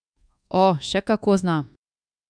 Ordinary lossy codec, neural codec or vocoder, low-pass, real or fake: none; codec, 24 kHz, 1.2 kbps, DualCodec; 9.9 kHz; fake